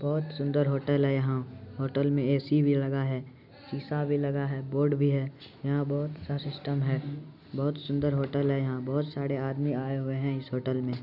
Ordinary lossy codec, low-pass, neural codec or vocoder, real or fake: none; 5.4 kHz; none; real